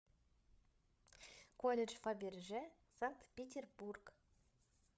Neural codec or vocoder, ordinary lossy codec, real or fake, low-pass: codec, 16 kHz, 8 kbps, FreqCodec, larger model; none; fake; none